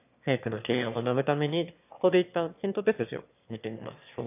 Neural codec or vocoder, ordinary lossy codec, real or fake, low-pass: autoencoder, 22.05 kHz, a latent of 192 numbers a frame, VITS, trained on one speaker; none; fake; 3.6 kHz